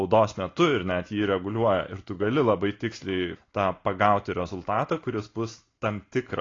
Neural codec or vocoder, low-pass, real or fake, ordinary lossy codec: none; 7.2 kHz; real; AAC, 32 kbps